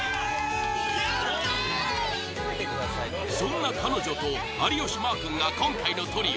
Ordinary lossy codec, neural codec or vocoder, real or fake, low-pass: none; none; real; none